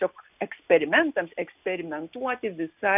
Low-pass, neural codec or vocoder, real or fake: 3.6 kHz; none; real